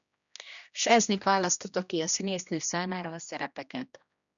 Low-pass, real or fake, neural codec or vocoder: 7.2 kHz; fake; codec, 16 kHz, 1 kbps, X-Codec, HuBERT features, trained on general audio